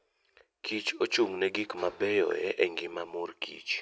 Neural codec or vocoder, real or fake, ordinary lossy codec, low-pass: none; real; none; none